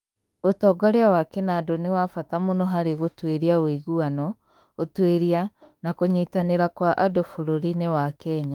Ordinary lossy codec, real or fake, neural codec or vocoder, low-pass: Opus, 32 kbps; fake; autoencoder, 48 kHz, 32 numbers a frame, DAC-VAE, trained on Japanese speech; 19.8 kHz